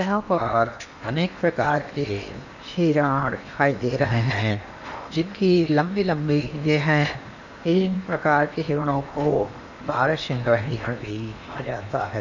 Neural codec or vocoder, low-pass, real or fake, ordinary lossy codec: codec, 16 kHz in and 24 kHz out, 0.8 kbps, FocalCodec, streaming, 65536 codes; 7.2 kHz; fake; none